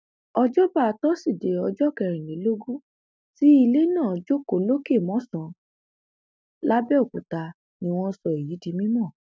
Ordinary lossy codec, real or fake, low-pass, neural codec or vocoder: none; real; none; none